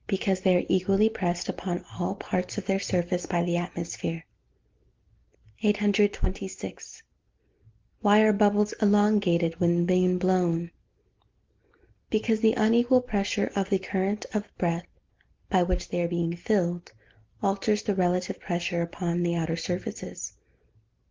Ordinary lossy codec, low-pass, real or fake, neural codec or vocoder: Opus, 16 kbps; 7.2 kHz; real; none